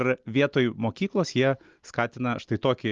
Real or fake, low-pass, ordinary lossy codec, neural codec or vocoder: real; 7.2 kHz; Opus, 32 kbps; none